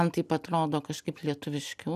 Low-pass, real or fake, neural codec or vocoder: 14.4 kHz; real; none